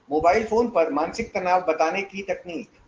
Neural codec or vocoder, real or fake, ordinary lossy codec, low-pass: none; real; Opus, 16 kbps; 7.2 kHz